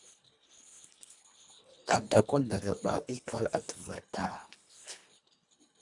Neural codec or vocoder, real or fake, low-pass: codec, 24 kHz, 1.5 kbps, HILCodec; fake; 10.8 kHz